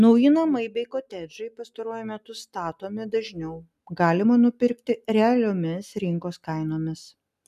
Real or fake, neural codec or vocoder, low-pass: real; none; 14.4 kHz